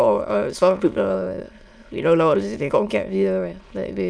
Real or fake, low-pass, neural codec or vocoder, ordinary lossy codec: fake; none; autoencoder, 22.05 kHz, a latent of 192 numbers a frame, VITS, trained on many speakers; none